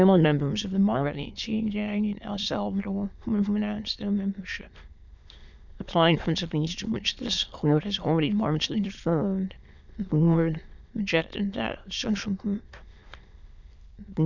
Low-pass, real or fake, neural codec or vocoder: 7.2 kHz; fake; autoencoder, 22.05 kHz, a latent of 192 numbers a frame, VITS, trained on many speakers